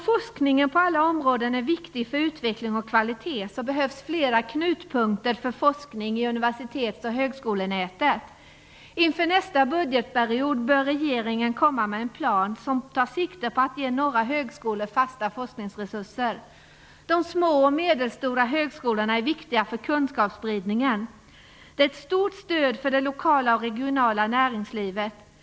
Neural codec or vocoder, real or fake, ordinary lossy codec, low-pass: none; real; none; none